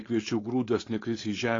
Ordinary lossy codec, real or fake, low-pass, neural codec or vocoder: AAC, 32 kbps; fake; 7.2 kHz; codec, 16 kHz, 4.8 kbps, FACodec